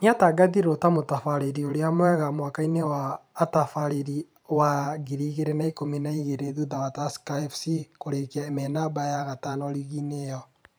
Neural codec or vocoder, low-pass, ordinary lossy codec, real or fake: vocoder, 44.1 kHz, 128 mel bands every 512 samples, BigVGAN v2; none; none; fake